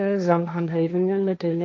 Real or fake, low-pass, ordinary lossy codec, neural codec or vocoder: fake; none; none; codec, 16 kHz, 1.1 kbps, Voila-Tokenizer